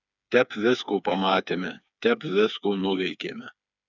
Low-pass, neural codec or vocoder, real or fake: 7.2 kHz; codec, 16 kHz, 4 kbps, FreqCodec, smaller model; fake